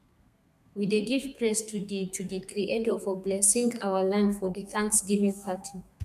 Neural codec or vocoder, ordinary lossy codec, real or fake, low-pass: codec, 32 kHz, 1.9 kbps, SNAC; none; fake; 14.4 kHz